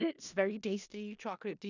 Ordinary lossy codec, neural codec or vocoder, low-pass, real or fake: none; codec, 16 kHz in and 24 kHz out, 0.4 kbps, LongCat-Audio-Codec, four codebook decoder; 7.2 kHz; fake